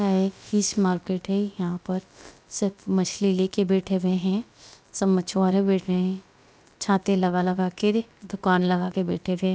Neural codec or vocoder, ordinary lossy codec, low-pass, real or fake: codec, 16 kHz, about 1 kbps, DyCAST, with the encoder's durations; none; none; fake